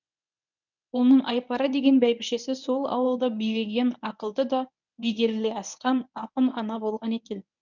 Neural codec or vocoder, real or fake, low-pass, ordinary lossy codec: codec, 24 kHz, 0.9 kbps, WavTokenizer, medium speech release version 1; fake; 7.2 kHz; none